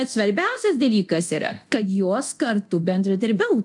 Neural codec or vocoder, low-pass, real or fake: codec, 24 kHz, 0.9 kbps, DualCodec; 10.8 kHz; fake